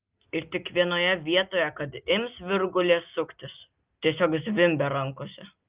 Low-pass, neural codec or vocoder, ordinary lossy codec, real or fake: 3.6 kHz; none; Opus, 24 kbps; real